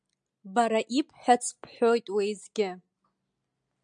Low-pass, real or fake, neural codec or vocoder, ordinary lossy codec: 9.9 kHz; real; none; MP3, 96 kbps